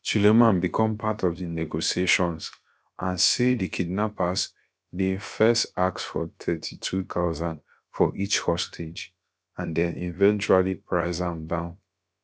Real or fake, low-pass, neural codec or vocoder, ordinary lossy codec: fake; none; codec, 16 kHz, about 1 kbps, DyCAST, with the encoder's durations; none